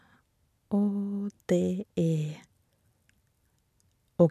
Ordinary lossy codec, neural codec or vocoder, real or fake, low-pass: none; none; real; 14.4 kHz